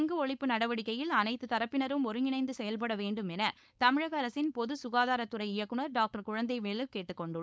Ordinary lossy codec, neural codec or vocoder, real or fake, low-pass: none; codec, 16 kHz, 4.8 kbps, FACodec; fake; none